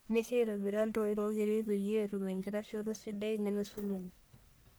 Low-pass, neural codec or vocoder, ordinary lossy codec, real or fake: none; codec, 44.1 kHz, 1.7 kbps, Pupu-Codec; none; fake